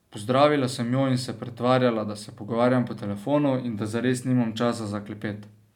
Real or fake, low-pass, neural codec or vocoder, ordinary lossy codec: real; 19.8 kHz; none; none